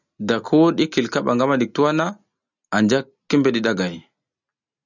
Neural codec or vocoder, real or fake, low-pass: none; real; 7.2 kHz